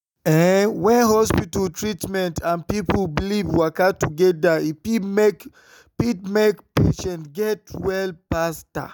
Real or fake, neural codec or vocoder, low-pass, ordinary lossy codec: real; none; none; none